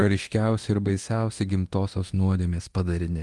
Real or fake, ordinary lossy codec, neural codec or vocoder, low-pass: fake; Opus, 24 kbps; codec, 24 kHz, 0.9 kbps, DualCodec; 10.8 kHz